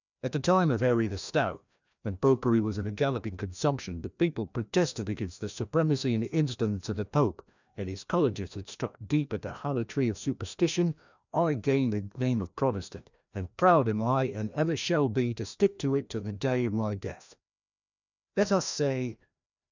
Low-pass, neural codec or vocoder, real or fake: 7.2 kHz; codec, 16 kHz, 1 kbps, FreqCodec, larger model; fake